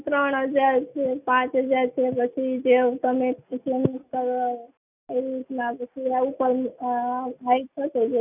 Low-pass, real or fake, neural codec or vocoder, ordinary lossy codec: 3.6 kHz; real; none; none